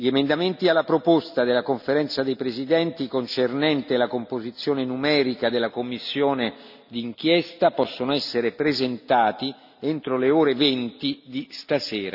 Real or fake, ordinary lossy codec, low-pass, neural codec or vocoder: real; none; 5.4 kHz; none